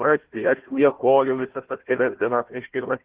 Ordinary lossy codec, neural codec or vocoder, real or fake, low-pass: Opus, 16 kbps; codec, 16 kHz, 1 kbps, FunCodec, trained on Chinese and English, 50 frames a second; fake; 3.6 kHz